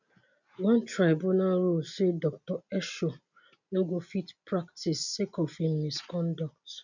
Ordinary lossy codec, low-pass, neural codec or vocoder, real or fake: none; 7.2 kHz; none; real